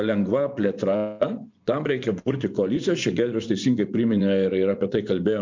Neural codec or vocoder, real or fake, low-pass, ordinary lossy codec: vocoder, 24 kHz, 100 mel bands, Vocos; fake; 7.2 kHz; MP3, 64 kbps